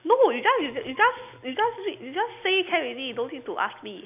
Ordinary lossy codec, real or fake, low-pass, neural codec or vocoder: none; real; 3.6 kHz; none